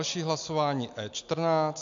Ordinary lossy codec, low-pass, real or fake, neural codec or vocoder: AAC, 64 kbps; 7.2 kHz; real; none